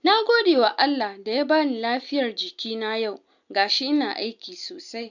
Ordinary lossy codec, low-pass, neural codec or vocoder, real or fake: none; 7.2 kHz; none; real